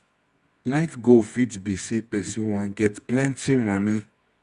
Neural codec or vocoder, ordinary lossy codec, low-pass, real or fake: codec, 24 kHz, 0.9 kbps, WavTokenizer, medium music audio release; Opus, 64 kbps; 10.8 kHz; fake